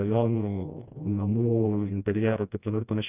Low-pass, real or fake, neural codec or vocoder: 3.6 kHz; fake; codec, 16 kHz, 1 kbps, FreqCodec, smaller model